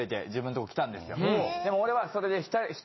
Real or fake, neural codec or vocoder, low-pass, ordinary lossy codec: real; none; 7.2 kHz; MP3, 24 kbps